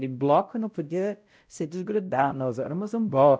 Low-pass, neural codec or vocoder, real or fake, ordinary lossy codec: none; codec, 16 kHz, 0.5 kbps, X-Codec, WavLM features, trained on Multilingual LibriSpeech; fake; none